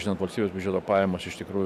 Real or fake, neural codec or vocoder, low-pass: fake; vocoder, 44.1 kHz, 128 mel bands every 512 samples, BigVGAN v2; 14.4 kHz